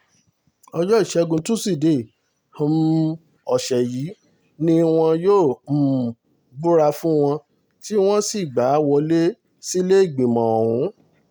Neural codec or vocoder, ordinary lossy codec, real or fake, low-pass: none; none; real; none